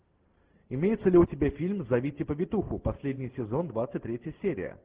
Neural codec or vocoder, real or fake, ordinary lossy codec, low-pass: none; real; Opus, 16 kbps; 3.6 kHz